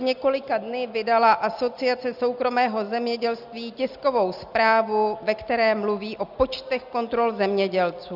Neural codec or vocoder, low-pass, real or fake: none; 5.4 kHz; real